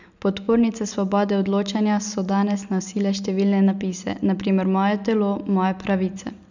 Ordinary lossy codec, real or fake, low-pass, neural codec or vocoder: none; real; 7.2 kHz; none